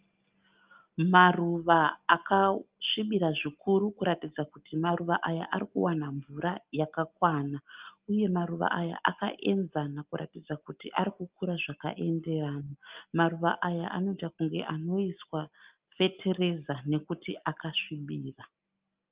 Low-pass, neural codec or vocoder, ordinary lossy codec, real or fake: 3.6 kHz; none; Opus, 32 kbps; real